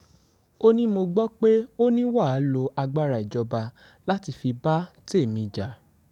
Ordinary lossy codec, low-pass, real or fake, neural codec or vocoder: none; 19.8 kHz; fake; codec, 44.1 kHz, 7.8 kbps, DAC